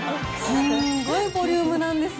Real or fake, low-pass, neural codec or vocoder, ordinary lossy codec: real; none; none; none